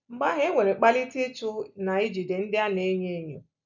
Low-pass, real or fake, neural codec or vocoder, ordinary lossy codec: 7.2 kHz; real; none; none